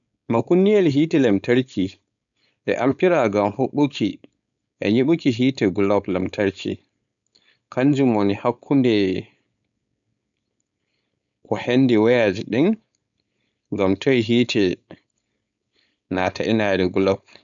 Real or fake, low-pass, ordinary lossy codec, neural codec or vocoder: fake; 7.2 kHz; none; codec, 16 kHz, 4.8 kbps, FACodec